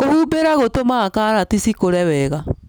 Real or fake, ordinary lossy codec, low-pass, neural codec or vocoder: real; none; none; none